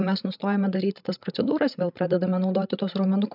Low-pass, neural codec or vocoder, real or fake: 5.4 kHz; codec, 16 kHz, 8 kbps, FreqCodec, larger model; fake